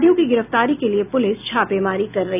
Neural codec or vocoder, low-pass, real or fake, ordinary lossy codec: none; 3.6 kHz; real; none